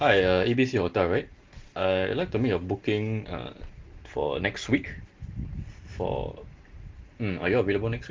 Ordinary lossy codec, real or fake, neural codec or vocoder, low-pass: Opus, 16 kbps; real; none; 7.2 kHz